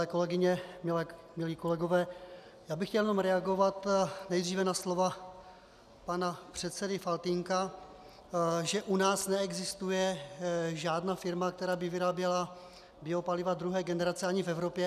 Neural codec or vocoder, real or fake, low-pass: none; real; 14.4 kHz